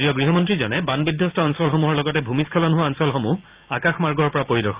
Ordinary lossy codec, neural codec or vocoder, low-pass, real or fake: Opus, 32 kbps; none; 3.6 kHz; real